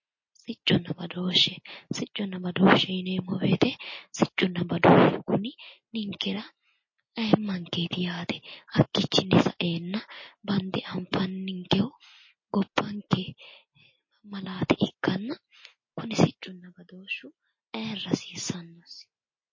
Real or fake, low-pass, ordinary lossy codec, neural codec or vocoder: real; 7.2 kHz; MP3, 32 kbps; none